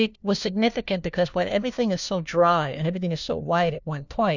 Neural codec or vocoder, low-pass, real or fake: codec, 16 kHz, 1 kbps, FunCodec, trained on LibriTTS, 50 frames a second; 7.2 kHz; fake